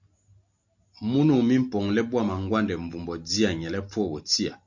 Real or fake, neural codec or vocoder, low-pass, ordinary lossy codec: real; none; 7.2 kHz; MP3, 48 kbps